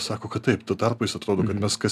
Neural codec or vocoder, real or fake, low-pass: none; real; 14.4 kHz